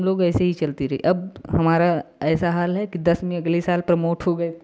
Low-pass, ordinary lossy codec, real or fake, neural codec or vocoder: none; none; real; none